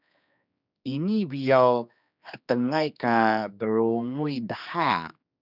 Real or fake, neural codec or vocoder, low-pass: fake; codec, 16 kHz, 2 kbps, X-Codec, HuBERT features, trained on general audio; 5.4 kHz